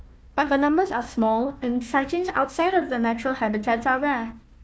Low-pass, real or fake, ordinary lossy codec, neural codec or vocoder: none; fake; none; codec, 16 kHz, 1 kbps, FunCodec, trained on Chinese and English, 50 frames a second